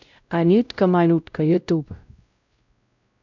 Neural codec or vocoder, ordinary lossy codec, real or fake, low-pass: codec, 16 kHz, 0.5 kbps, X-Codec, WavLM features, trained on Multilingual LibriSpeech; none; fake; 7.2 kHz